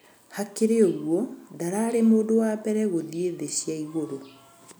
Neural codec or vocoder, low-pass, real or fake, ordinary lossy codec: none; none; real; none